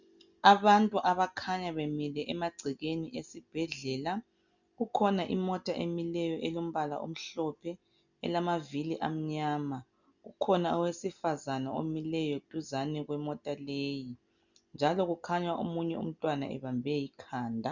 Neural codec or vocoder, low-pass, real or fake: none; 7.2 kHz; real